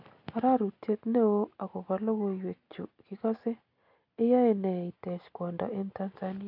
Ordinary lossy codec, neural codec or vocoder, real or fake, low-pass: AAC, 48 kbps; none; real; 5.4 kHz